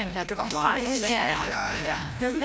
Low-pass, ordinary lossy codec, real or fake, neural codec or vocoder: none; none; fake; codec, 16 kHz, 0.5 kbps, FreqCodec, larger model